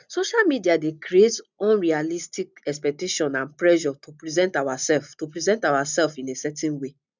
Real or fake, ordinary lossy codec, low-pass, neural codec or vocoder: real; none; 7.2 kHz; none